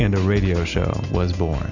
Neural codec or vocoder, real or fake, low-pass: none; real; 7.2 kHz